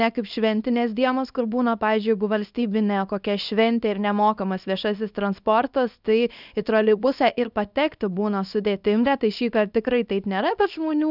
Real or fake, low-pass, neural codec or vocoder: fake; 5.4 kHz; codec, 24 kHz, 0.9 kbps, WavTokenizer, small release